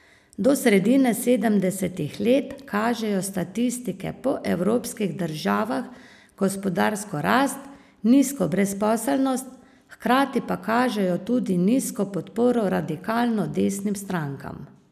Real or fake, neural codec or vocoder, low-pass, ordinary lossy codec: real; none; 14.4 kHz; none